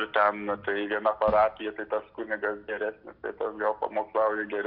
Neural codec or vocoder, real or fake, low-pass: none; real; 5.4 kHz